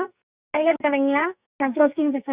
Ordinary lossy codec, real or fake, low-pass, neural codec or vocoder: Opus, 64 kbps; fake; 3.6 kHz; codec, 44.1 kHz, 2.6 kbps, SNAC